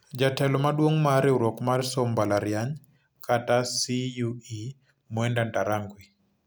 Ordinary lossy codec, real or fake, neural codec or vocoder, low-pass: none; real; none; none